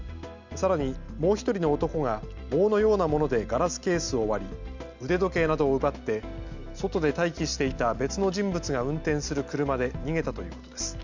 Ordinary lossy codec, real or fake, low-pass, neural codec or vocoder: Opus, 64 kbps; real; 7.2 kHz; none